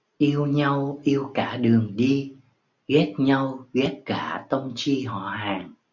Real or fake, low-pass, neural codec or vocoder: real; 7.2 kHz; none